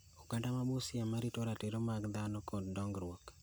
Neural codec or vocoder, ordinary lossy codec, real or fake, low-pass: none; none; real; none